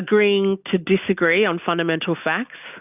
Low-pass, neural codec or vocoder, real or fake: 3.6 kHz; none; real